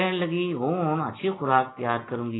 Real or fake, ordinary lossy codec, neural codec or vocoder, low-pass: real; AAC, 16 kbps; none; 7.2 kHz